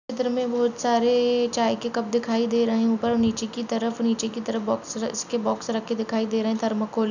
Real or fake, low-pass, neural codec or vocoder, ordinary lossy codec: real; 7.2 kHz; none; none